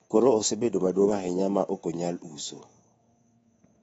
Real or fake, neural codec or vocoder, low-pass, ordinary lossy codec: fake; codec, 24 kHz, 3.1 kbps, DualCodec; 10.8 kHz; AAC, 24 kbps